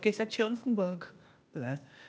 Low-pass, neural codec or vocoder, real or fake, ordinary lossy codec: none; codec, 16 kHz, 0.8 kbps, ZipCodec; fake; none